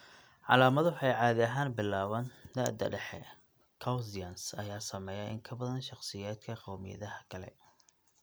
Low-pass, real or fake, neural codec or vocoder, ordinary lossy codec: none; real; none; none